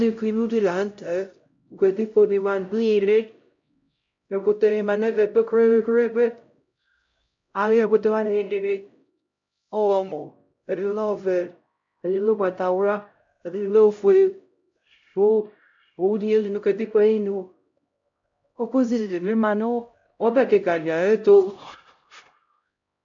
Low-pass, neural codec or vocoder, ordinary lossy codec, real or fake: 7.2 kHz; codec, 16 kHz, 0.5 kbps, X-Codec, HuBERT features, trained on LibriSpeech; MP3, 48 kbps; fake